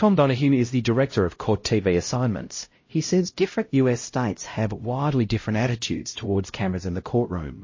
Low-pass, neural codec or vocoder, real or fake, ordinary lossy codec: 7.2 kHz; codec, 16 kHz, 0.5 kbps, X-Codec, HuBERT features, trained on LibriSpeech; fake; MP3, 32 kbps